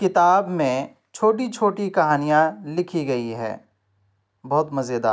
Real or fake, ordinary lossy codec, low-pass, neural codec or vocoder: real; none; none; none